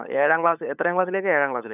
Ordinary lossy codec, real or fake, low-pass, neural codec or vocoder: none; fake; 3.6 kHz; codec, 16 kHz, 8 kbps, FunCodec, trained on LibriTTS, 25 frames a second